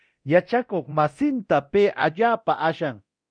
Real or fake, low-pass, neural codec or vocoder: fake; 9.9 kHz; codec, 24 kHz, 0.9 kbps, DualCodec